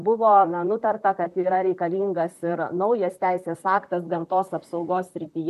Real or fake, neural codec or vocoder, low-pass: fake; vocoder, 44.1 kHz, 128 mel bands, Pupu-Vocoder; 14.4 kHz